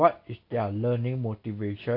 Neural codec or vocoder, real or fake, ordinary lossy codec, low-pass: none; real; AAC, 32 kbps; 5.4 kHz